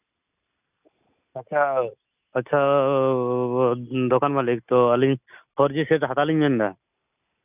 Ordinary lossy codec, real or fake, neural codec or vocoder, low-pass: none; real; none; 3.6 kHz